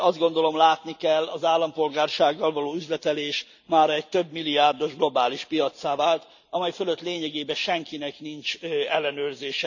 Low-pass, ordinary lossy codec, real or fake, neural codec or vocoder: 7.2 kHz; none; real; none